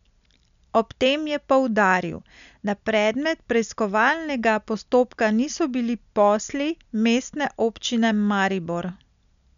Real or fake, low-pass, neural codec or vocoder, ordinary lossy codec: real; 7.2 kHz; none; none